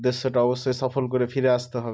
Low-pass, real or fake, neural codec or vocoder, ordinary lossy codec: none; real; none; none